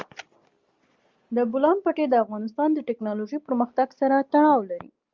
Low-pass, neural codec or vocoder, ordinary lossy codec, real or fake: 7.2 kHz; none; Opus, 32 kbps; real